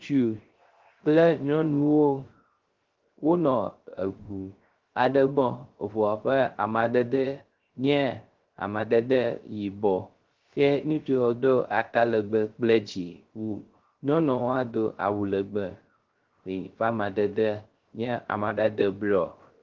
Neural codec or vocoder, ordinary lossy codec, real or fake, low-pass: codec, 16 kHz, 0.3 kbps, FocalCodec; Opus, 16 kbps; fake; 7.2 kHz